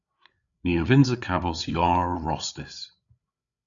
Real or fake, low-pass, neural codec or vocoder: fake; 7.2 kHz; codec, 16 kHz, 8 kbps, FreqCodec, larger model